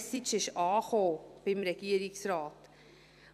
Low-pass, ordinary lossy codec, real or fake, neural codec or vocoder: 14.4 kHz; none; fake; vocoder, 44.1 kHz, 128 mel bands every 256 samples, BigVGAN v2